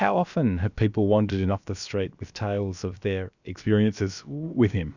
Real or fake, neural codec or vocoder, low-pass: fake; codec, 16 kHz, about 1 kbps, DyCAST, with the encoder's durations; 7.2 kHz